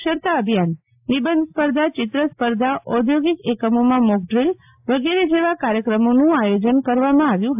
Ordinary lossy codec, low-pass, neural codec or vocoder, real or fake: AAC, 32 kbps; 3.6 kHz; none; real